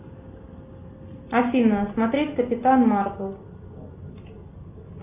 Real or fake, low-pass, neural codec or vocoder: real; 3.6 kHz; none